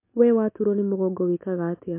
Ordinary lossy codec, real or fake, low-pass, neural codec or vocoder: MP3, 24 kbps; real; 3.6 kHz; none